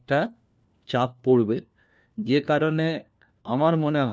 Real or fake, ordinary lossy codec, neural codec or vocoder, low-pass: fake; none; codec, 16 kHz, 1 kbps, FunCodec, trained on LibriTTS, 50 frames a second; none